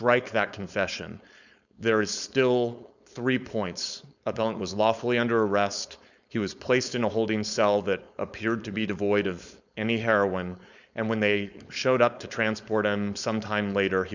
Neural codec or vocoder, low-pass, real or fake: codec, 16 kHz, 4.8 kbps, FACodec; 7.2 kHz; fake